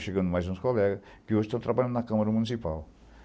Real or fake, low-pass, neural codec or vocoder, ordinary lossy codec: real; none; none; none